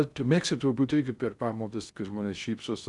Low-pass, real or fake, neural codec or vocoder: 10.8 kHz; fake; codec, 16 kHz in and 24 kHz out, 0.6 kbps, FocalCodec, streaming, 2048 codes